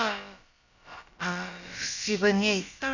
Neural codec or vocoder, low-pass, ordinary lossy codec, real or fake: codec, 16 kHz, about 1 kbps, DyCAST, with the encoder's durations; 7.2 kHz; none; fake